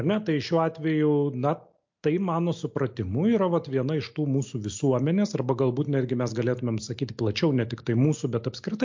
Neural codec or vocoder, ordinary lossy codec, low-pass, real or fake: none; MP3, 48 kbps; 7.2 kHz; real